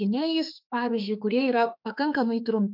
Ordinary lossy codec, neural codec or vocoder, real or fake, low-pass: MP3, 48 kbps; autoencoder, 48 kHz, 32 numbers a frame, DAC-VAE, trained on Japanese speech; fake; 5.4 kHz